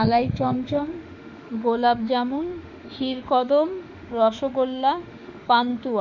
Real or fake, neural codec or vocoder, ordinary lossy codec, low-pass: fake; autoencoder, 48 kHz, 32 numbers a frame, DAC-VAE, trained on Japanese speech; none; 7.2 kHz